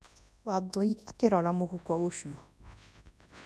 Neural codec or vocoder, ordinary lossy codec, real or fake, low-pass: codec, 24 kHz, 0.9 kbps, WavTokenizer, large speech release; none; fake; none